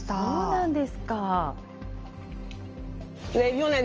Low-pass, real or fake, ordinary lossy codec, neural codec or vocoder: 7.2 kHz; real; Opus, 24 kbps; none